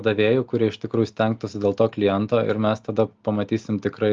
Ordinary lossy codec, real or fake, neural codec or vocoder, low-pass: Opus, 32 kbps; real; none; 7.2 kHz